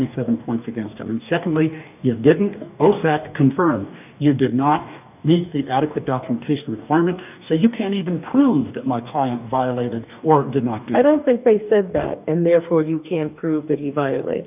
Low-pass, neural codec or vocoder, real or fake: 3.6 kHz; codec, 44.1 kHz, 2.6 kbps, DAC; fake